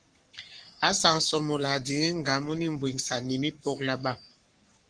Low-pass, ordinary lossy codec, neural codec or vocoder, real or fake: 9.9 kHz; Opus, 24 kbps; codec, 44.1 kHz, 7.8 kbps, Pupu-Codec; fake